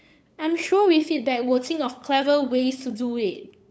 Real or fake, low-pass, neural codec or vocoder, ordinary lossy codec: fake; none; codec, 16 kHz, 8 kbps, FunCodec, trained on LibriTTS, 25 frames a second; none